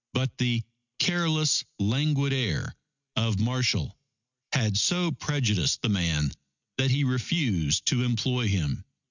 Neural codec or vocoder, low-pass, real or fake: none; 7.2 kHz; real